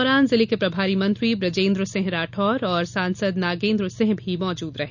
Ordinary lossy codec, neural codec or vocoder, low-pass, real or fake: none; none; 7.2 kHz; real